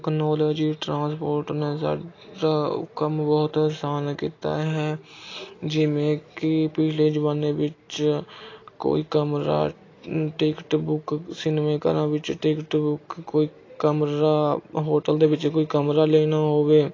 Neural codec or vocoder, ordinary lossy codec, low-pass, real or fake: none; AAC, 32 kbps; 7.2 kHz; real